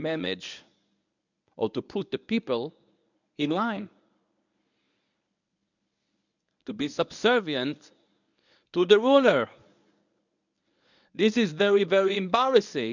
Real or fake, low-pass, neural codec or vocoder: fake; 7.2 kHz; codec, 24 kHz, 0.9 kbps, WavTokenizer, medium speech release version 1